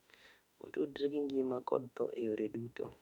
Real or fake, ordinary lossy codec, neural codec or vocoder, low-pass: fake; none; autoencoder, 48 kHz, 32 numbers a frame, DAC-VAE, trained on Japanese speech; 19.8 kHz